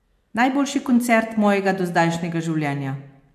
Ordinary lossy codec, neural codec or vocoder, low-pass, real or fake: AAC, 96 kbps; none; 14.4 kHz; real